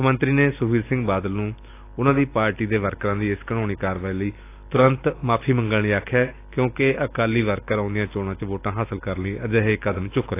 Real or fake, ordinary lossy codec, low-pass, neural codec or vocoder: real; AAC, 24 kbps; 3.6 kHz; none